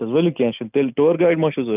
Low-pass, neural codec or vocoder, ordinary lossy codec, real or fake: 3.6 kHz; none; none; real